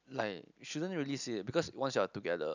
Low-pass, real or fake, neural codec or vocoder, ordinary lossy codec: 7.2 kHz; real; none; none